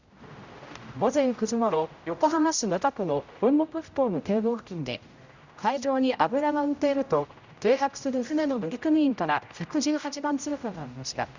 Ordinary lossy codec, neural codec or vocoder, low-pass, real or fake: Opus, 64 kbps; codec, 16 kHz, 0.5 kbps, X-Codec, HuBERT features, trained on general audio; 7.2 kHz; fake